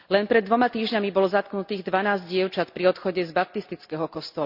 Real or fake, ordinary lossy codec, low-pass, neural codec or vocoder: real; Opus, 64 kbps; 5.4 kHz; none